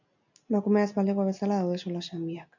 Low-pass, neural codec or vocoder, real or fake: 7.2 kHz; none; real